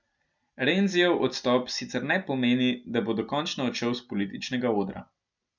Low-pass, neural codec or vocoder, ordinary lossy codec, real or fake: 7.2 kHz; none; none; real